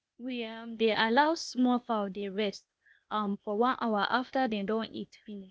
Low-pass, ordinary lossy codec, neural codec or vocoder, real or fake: none; none; codec, 16 kHz, 0.8 kbps, ZipCodec; fake